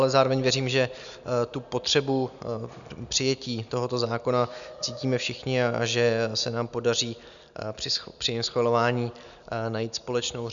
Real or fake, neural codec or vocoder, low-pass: real; none; 7.2 kHz